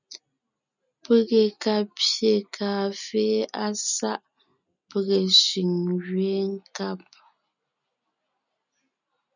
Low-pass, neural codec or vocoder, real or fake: 7.2 kHz; none; real